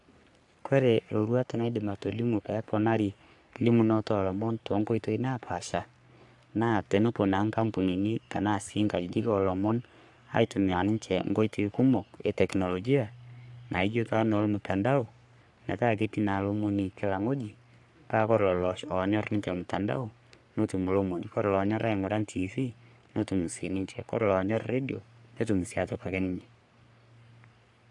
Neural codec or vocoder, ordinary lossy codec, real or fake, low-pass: codec, 44.1 kHz, 3.4 kbps, Pupu-Codec; AAC, 64 kbps; fake; 10.8 kHz